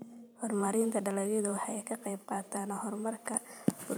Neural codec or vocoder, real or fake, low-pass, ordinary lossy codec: vocoder, 44.1 kHz, 128 mel bands every 512 samples, BigVGAN v2; fake; none; none